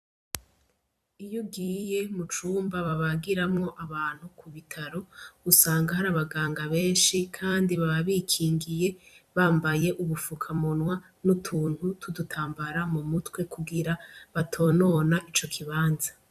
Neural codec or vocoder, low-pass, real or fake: vocoder, 44.1 kHz, 128 mel bands every 256 samples, BigVGAN v2; 14.4 kHz; fake